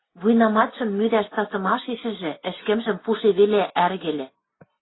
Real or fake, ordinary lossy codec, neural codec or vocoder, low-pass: real; AAC, 16 kbps; none; 7.2 kHz